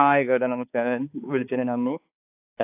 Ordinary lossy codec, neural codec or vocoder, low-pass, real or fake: AAC, 32 kbps; codec, 16 kHz, 2 kbps, X-Codec, HuBERT features, trained on balanced general audio; 3.6 kHz; fake